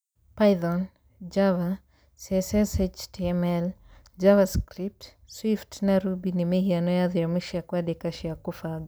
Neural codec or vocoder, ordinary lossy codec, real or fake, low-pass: none; none; real; none